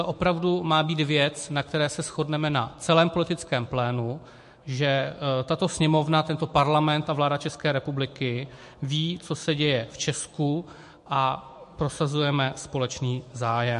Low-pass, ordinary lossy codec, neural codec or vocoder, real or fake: 14.4 kHz; MP3, 48 kbps; autoencoder, 48 kHz, 128 numbers a frame, DAC-VAE, trained on Japanese speech; fake